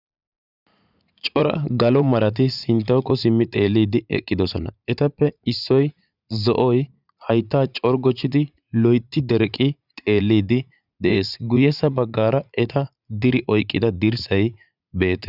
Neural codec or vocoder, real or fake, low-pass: vocoder, 44.1 kHz, 80 mel bands, Vocos; fake; 5.4 kHz